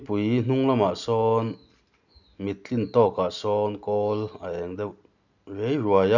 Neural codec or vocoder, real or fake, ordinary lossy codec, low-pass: none; real; none; 7.2 kHz